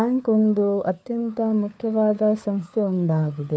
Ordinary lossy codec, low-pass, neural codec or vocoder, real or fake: none; none; codec, 16 kHz, 4 kbps, FunCodec, trained on LibriTTS, 50 frames a second; fake